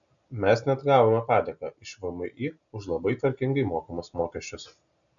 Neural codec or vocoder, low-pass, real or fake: none; 7.2 kHz; real